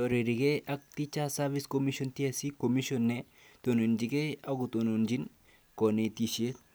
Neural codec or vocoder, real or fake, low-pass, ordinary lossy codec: none; real; none; none